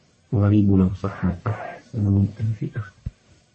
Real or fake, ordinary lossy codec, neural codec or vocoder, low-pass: fake; MP3, 32 kbps; codec, 44.1 kHz, 1.7 kbps, Pupu-Codec; 10.8 kHz